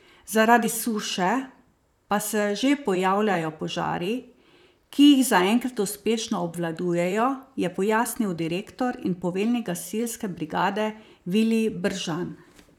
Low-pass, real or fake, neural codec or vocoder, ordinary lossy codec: 19.8 kHz; fake; vocoder, 44.1 kHz, 128 mel bands, Pupu-Vocoder; none